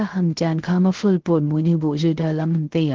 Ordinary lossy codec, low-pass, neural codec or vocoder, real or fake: Opus, 16 kbps; 7.2 kHz; codec, 16 kHz, 0.3 kbps, FocalCodec; fake